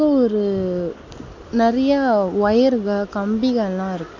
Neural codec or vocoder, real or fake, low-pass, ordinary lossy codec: none; real; 7.2 kHz; AAC, 48 kbps